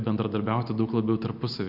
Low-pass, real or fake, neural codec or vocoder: 5.4 kHz; real; none